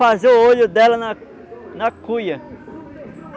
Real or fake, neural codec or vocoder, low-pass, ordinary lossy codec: real; none; none; none